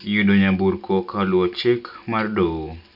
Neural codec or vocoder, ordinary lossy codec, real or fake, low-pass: none; none; real; 5.4 kHz